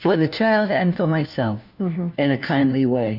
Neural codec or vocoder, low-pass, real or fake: codec, 16 kHz, 1 kbps, FunCodec, trained on LibriTTS, 50 frames a second; 5.4 kHz; fake